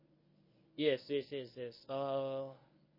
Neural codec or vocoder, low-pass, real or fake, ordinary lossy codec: codec, 24 kHz, 0.9 kbps, WavTokenizer, medium speech release version 1; 5.4 kHz; fake; MP3, 24 kbps